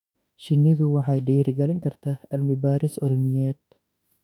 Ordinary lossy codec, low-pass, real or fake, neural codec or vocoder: none; 19.8 kHz; fake; autoencoder, 48 kHz, 32 numbers a frame, DAC-VAE, trained on Japanese speech